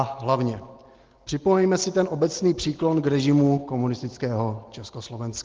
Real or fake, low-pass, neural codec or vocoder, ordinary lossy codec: real; 7.2 kHz; none; Opus, 16 kbps